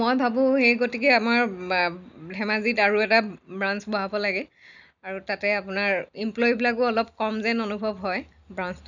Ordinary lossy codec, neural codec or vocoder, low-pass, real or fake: none; none; 7.2 kHz; real